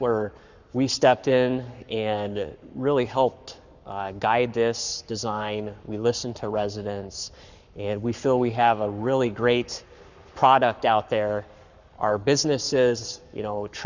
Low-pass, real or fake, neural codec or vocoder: 7.2 kHz; fake; codec, 44.1 kHz, 7.8 kbps, Pupu-Codec